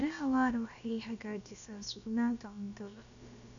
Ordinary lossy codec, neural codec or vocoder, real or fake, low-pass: MP3, 96 kbps; codec, 16 kHz, about 1 kbps, DyCAST, with the encoder's durations; fake; 7.2 kHz